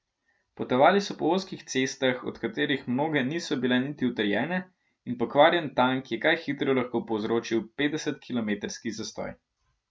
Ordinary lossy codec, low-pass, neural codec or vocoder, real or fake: none; none; none; real